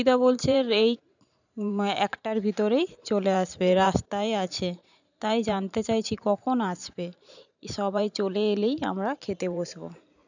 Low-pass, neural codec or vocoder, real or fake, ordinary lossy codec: 7.2 kHz; vocoder, 44.1 kHz, 128 mel bands every 512 samples, BigVGAN v2; fake; none